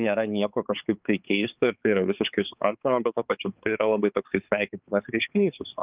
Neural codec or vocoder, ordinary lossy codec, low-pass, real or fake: codec, 16 kHz, 4 kbps, X-Codec, HuBERT features, trained on balanced general audio; Opus, 24 kbps; 3.6 kHz; fake